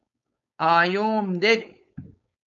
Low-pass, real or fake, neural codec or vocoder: 7.2 kHz; fake; codec, 16 kHz, 4.8 kbps, FACodec